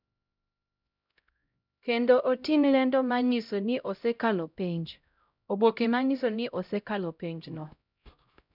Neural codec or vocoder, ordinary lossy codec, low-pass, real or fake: codec, 16 kHz, 0.5 kbps, X-Codec, HuBERT features, trained on LibriSpeech; none; 5.4 kHz; fake